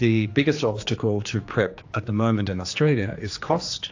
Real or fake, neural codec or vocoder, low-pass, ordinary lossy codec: fake; codec, 16 kHz, 2 kbps, X-Codec, HuBERT features, trained on general audio; 7.2 kHz; AAC, 48 kbps